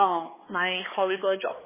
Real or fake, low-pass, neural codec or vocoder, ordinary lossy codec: fake; 3.6 kHz; codec, 16 kHz, 2 kbps, X-Codec, HuBERT features, trained on balanced general audio; MP3, 16 kbps